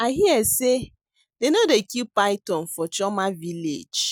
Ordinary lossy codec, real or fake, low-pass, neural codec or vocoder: none; real; none; none